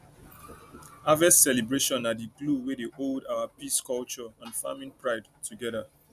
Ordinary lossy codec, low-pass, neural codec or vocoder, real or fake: none; 14.4 kHz; none; real